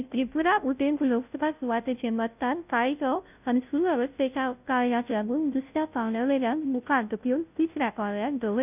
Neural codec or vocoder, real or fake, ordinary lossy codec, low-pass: codec, 16 kHz, 0.5 kbps, FunCodec, trained on Chinese and English, 25 frames a second; fake; none; 3.6 kHz